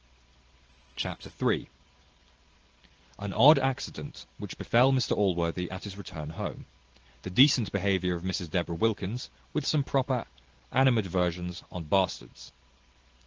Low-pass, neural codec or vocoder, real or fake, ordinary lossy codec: 7.2 kHz; none; real; Opus, 16 kbps